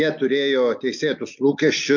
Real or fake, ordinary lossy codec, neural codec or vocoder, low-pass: real; MP3, 48 kbps; none; 7.2 kHz